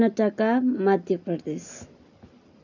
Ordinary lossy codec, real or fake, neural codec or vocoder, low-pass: none; real; none; 7.2 kHz